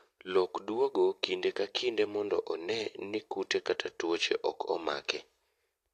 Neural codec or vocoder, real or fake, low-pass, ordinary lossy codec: none; real; 14.4 kHz; AAC, 64 kbps